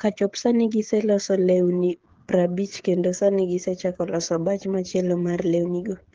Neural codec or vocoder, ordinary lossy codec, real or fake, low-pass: codec, 16 kHz, 8 kbps, FreqCodec, smaller model; Opus, 16 kbps; fake; 7.2 kHz